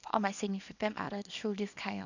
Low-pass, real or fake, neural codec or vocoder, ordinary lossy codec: 7.2 kHz; fake; codec, 24 kHz, 0.9 kbps, WavTokenizer, small release; none